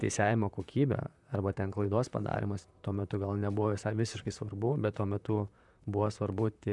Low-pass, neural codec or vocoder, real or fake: 10.8 kHz; vocoder, 44.1 kHz, 128 mel bands, Pupu-Vocoder; fake